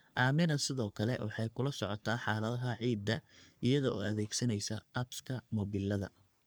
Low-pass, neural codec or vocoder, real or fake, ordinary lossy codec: none; codec, 44.1 kHz, 3.4 kbps, Pupu-Codec; fake; none